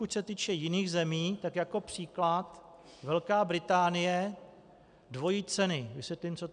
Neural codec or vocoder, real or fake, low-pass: none; real; 9.9 kHz